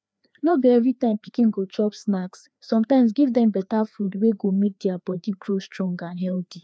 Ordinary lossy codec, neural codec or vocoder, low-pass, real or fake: none; codec, 16 kHz, 2 kbps, FreqCodec, larger model; none; fake